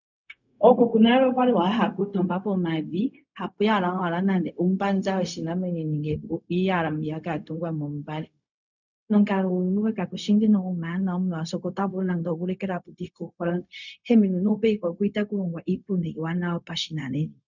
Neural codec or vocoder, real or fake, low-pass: codec, 16 kHz, 0.4 kbps, LongCat-Audio-Codec; fake; 7.2 kHz